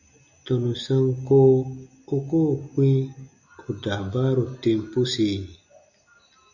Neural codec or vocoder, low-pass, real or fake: none; 7.2 kHz; real